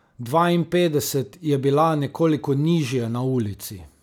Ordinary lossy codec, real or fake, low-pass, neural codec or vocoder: none; real; 19.8 kHz; none